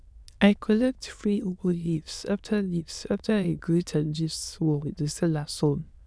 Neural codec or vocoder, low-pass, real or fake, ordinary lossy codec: autoencoder, 22.05 kHz, a latent of 192 numbers a frame, VITS, trained on many speakers; none; fake; none